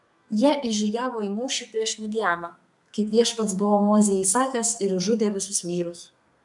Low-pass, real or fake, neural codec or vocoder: 10.8 kHz; fake; codec, 32 kHz, 1.9 kbps, SNAC